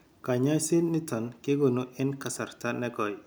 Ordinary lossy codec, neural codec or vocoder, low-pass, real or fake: none; none; none; real